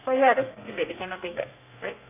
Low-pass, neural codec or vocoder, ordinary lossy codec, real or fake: 3.6 kHz; codec, 32 kHz, 1.9 kbps, SNAC; AAC, 24 kbps; fake